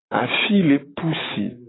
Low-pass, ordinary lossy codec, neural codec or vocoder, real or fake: 7.2 kHz; AAC, 16 kbps; none; real